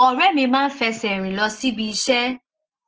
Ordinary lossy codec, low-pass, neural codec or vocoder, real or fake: Opus, 16 kbps; 7.2 kHz; none; real